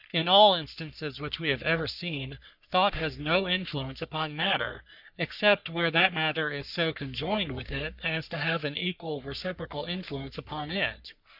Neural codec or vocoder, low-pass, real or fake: codec, 44.1 kHz, 3.4 kbps, Pupu-Codec; 5.4 kHz; fake